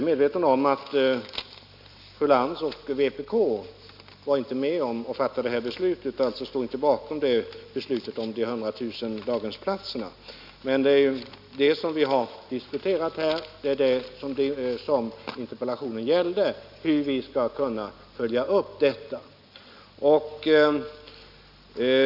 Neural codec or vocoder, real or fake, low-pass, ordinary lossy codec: none; real; 5.4 kHz; none